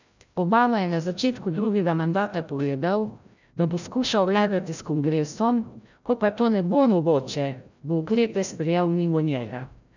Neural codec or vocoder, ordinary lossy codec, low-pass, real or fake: codec, 16 kHz, 0.5 kbps, FreqCodec, larger model; none; 7.2 kHz; fake